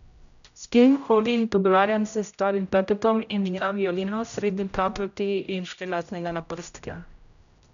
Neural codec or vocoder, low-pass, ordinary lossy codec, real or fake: codec, 16 kHz, 0.5 kbps, X-Codec, HuBERT features, trained on general audio; 7.2 kHz; none; fake